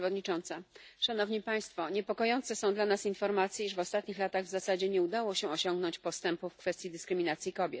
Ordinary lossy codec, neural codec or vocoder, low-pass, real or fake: none; none; none; real